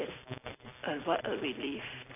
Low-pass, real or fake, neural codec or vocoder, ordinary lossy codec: 3.6 kHz; real; none; AAC, 24 kbps